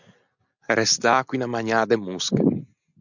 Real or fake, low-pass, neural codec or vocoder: real; 7.2 kHz; none